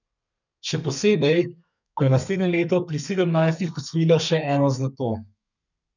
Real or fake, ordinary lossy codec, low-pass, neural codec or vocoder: fake; none; 7.2 kHz; codec, 32 kHz, 1.9 kbps, SNAC